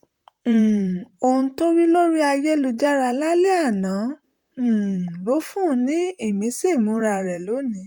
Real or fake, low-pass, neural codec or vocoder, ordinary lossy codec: fake; 19.8 kHz; vocoder, 44.1 kHz, 128 mel bands, Pupu-Vocoder; none